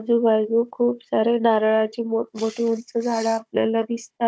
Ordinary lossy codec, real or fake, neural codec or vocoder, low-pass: none; fake; codec, 16 kHz, 16 kbps, FunCodec, trained on Chinese and English, 50 frames a second; none